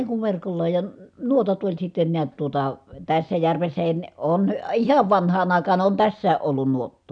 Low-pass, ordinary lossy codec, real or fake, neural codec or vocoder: 9.9 kHz; none; real; none